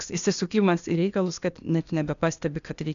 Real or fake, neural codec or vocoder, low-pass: fake; codec, 16 kHz, 0.8 kbps, ZipCodec; 7.2 kHz